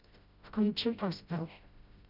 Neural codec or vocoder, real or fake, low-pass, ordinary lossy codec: codec, 16 kHz, 0.5 kbps, FreqCodec, smaller model; fake; 5.4 kHz; none